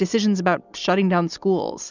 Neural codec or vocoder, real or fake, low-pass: none; real; 7.2 kHz